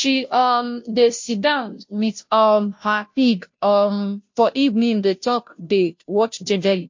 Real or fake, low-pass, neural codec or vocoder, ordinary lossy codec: fake; 7.2 kHz; codec, 16 kHz, 0.5 kbps, FunCodec, trained on Chinese and English, 25 frames a second; MP3, 48 kbps